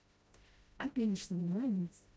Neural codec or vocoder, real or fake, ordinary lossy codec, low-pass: codec, 16 kHz, 0.5 kbps, FreqCodec, smaller model; fake; none; none